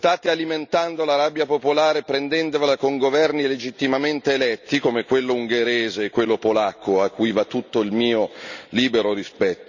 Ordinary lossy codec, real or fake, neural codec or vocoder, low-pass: none; real; none; 7.2 kHz